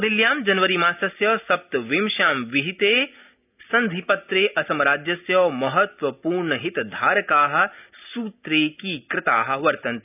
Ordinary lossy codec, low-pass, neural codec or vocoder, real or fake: none; 3.6 kHz; none; real